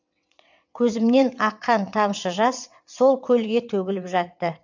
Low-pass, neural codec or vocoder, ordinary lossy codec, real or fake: 7.2 kHz; vocoder, 22.05 kHz, 80 mel bands, WaveNeXt; MP3, 64 kbps; fake